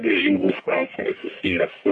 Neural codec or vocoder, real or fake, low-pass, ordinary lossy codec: codec, 44.1 kHz, 1.7 kbps, Pupu-Codec; fake; 10.8 kHz; MP3, 48 kbps